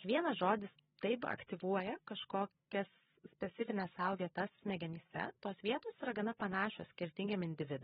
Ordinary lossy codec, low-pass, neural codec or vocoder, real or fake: AAC, 16 kbps; 7.2 kHz; codec, 16 kHz, 16 kbps, FreqCodec, larger model; fake